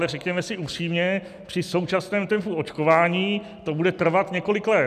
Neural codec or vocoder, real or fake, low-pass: none; real; 14.4 kHz